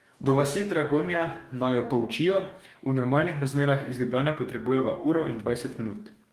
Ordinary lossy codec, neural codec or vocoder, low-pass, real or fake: Opus, 32 kbps; codec, 44.1 kHz, 2.6 kbps, DAC; 14.4 kHz; fake